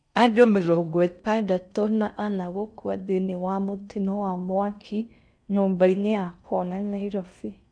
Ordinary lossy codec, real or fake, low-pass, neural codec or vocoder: none; fake; 9.9 kHz; codec, 16 kHz in and 24 kHz out, 0.6 kbps, FocalCodec, streaming, 4096 codes